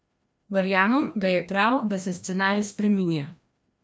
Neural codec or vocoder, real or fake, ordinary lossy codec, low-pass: codec, 16 kHz, 1 kbps, FreqCodec, larger model; fake; none; none